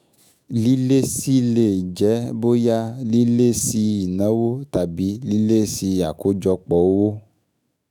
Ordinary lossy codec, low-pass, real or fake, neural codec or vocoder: none; none; fake; autoencoder, 48 kHz, 128 numbers a frame, DAC-VAE, trained on Japanese speech